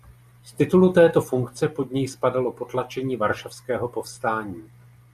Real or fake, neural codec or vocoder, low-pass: real; none; 14.4 kHz